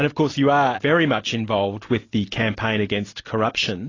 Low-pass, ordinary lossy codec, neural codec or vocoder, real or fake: 7.2 kHz; AAC, 32 kbps; none; real